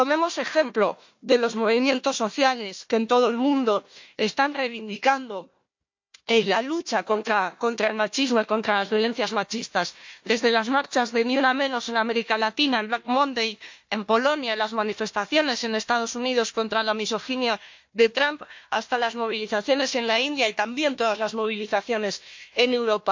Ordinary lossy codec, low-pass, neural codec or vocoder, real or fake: MP3, 48 kbps; 7.2 kHz; codec, 16 kHz, 1 kbps, FunCodec, trained on Chinese and English, 50 frames a second; fake